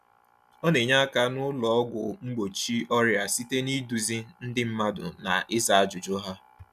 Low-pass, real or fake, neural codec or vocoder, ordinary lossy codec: 14.4 kHz; real; none; none